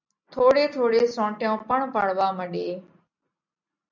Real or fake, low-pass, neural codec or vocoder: real; 7.2 kHz; none